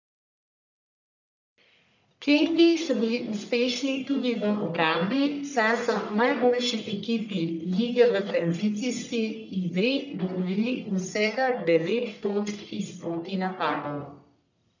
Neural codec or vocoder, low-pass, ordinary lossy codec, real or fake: codec, 44.1 kHz, 1.7 kbps, Pupu-Codec; 7.2 kHz; none; fake